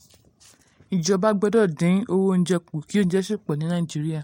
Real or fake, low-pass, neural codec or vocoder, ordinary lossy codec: real; 19.8 kHz; none; MP3, 64 kbps